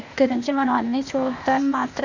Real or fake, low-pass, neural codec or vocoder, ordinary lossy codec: fake; 7.2 kHz; codec, 16 kHz, 0.8 kbps, ZipCodec; none